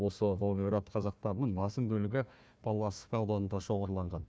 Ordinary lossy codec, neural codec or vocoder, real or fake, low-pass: none; codec, 16 kHz, 1 kbps, FunCodec, trained on Chinese and English, 50 frames a second; fake; none